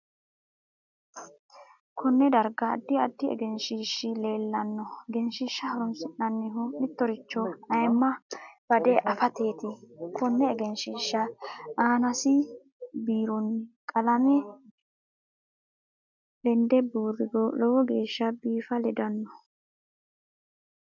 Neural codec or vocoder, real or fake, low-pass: none; real; 7.2 kHz